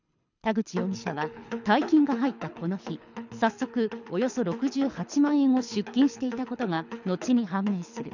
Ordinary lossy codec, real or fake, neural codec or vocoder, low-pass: none; fake; codec, 24 kHz, 6 kbps, HILCodec; 7.2 kHz